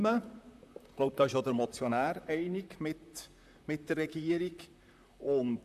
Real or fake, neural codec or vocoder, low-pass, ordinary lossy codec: fake; vocoder, 44.1 kHz, 128 mel bands, Pupu-Vocoder; 14.4 kHz; none